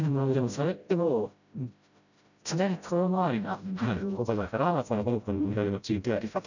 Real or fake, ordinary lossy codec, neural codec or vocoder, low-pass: fake; none; codec, 16 kHz, 0.5 kbps, FreqCodec, smaller model; 7.2 kHz